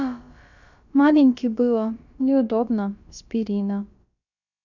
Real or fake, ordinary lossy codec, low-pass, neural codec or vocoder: fake; none; 7.2 kHz; codec, 16 kHz, about 1 kbps, DyCAST, with the encoder's durations